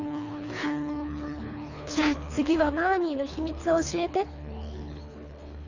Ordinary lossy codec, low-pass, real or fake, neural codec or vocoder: Opus, 64 kbps; 7.2 kHz; fake; codec, 24 kHz, 3 kbps, HILCodec